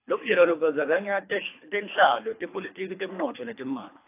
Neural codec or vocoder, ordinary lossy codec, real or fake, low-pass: codec, 24 kHz, 3 kbps, HILCodec; AAC, 24 kbps; fake; 3.6 kHz